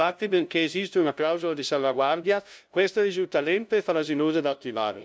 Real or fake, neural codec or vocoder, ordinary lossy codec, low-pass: fake; codec, 16 kHz, 0.5 kbps, FunCodec, trained on LibriTTS, 25 frames a second; none; none